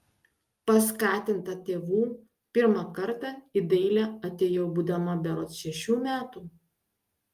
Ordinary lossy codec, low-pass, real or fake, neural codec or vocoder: Opus, 32 kbps; 14.4 kHz; real; none